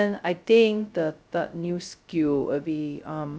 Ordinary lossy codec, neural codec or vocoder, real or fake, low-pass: none; codec, 16 kHz, 0.2 kbps, FocalCodec; fake; none